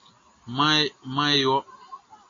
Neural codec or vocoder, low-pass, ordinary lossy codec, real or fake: none; 7.2 kHz; AAC, 32 kbps; real